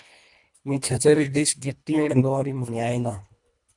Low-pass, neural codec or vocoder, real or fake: 10.8 kHz; codec, 24 kHz, 1.5 kbps, HILCodec; fake